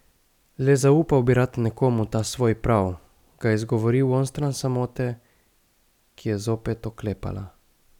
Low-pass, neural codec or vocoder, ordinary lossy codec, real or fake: 19.8 kHz; none; none; real